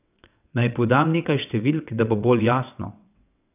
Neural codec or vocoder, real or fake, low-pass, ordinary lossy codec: vocoder, 22.05 kHz, 80 mel bands, WaveNeXt; fake; 3.6 kHz; none